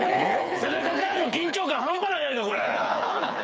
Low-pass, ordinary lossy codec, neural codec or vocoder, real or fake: none; none; codec, 16 kHz, 4 kbps, FreqCodec, smaller model; fake